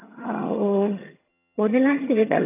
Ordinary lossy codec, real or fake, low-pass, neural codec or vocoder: AAC, 24 kbps; fake; 3.6 kHz; vocoder, 22.05 kHz, 80 mel bands, HiFi-GAN